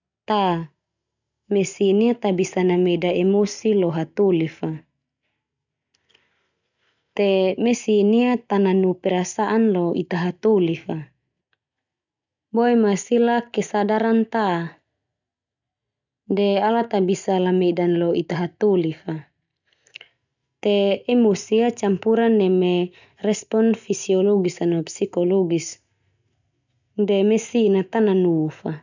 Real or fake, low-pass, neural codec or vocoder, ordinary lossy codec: real; 7.2 kHz; none; none